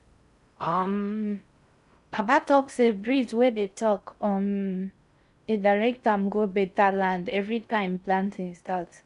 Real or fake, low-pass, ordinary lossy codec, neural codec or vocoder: fake; 10.8 kHz; none; codec, 16 kHz in and 24 kHz out, 0.6 kbps, FocalCodec, streaming, 4096 codes